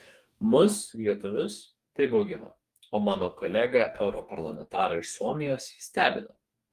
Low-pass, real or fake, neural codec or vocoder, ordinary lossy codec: 14.4 kHz; fake; codec, 44.1 kHz, 2.6 kbps, DAC; Opus, 32 kbps